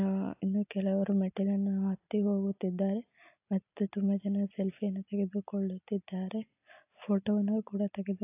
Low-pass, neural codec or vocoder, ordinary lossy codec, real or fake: 3.6 kHz; none; AAC, 32 kbps; real